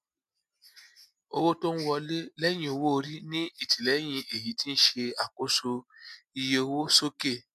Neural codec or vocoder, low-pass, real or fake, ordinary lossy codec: none; 14.4 kHz; real; MP3, 96 kbps